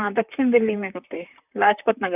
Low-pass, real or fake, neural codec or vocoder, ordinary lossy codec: 3.6 kHz; fake; vocoder, 44.1 kHz, 128 mel bands, Pupu-Vocoder; none